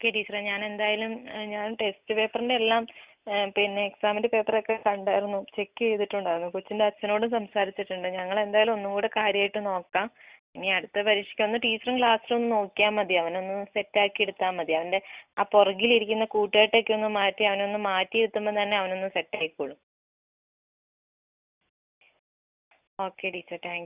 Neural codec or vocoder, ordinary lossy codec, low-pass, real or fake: none; Opus, 64 kbps; 3.6 kHz; real